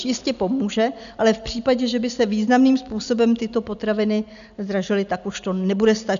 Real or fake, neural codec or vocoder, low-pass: real; none; 7.2 kHz